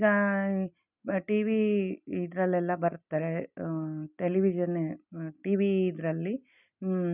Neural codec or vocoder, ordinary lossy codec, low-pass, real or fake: none; none; 3.6 kHz; real